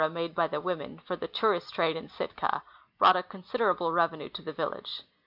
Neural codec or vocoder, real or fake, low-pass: none; real; 5.4 kHz